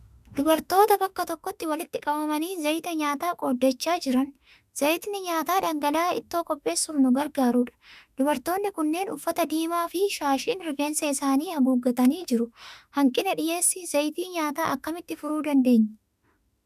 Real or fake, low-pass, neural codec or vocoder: fake; 14.4 kHz; autoencoder, 48 kHz, 32 numbers a frame, DAC-VAE, trained on Japanese speech